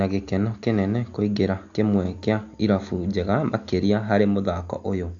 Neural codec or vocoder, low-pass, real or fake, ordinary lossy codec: none; 7.2 kHz; real; AAC, 64 kbps